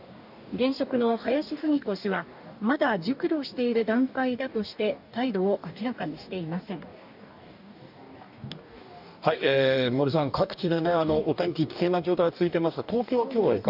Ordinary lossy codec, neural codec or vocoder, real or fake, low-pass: none; codec, 44.1 kHz, 2.6 kbps, DAC; fake; 5.4 kHz